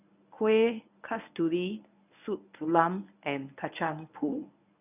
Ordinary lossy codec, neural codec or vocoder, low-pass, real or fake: none; codec, 24 kHz, 0.9 kbps, WavTokenizer, medium speech release version 1; 3.6 kHz; fake